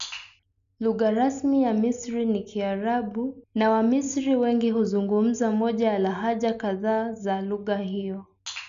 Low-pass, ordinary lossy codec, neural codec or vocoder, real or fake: 7.2 kHz; AAC, 96 kbps; none; real